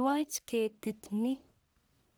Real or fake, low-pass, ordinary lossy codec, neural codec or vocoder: fake; none; none; codec, 44.1 kHz, 1.7 kbps, Pupu-Codec